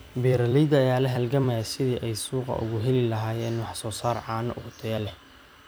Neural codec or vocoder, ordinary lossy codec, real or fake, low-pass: vocoder, 44.1 kHz, 128 mel bands every 256 samples, BigVGAN v2; none; fake; none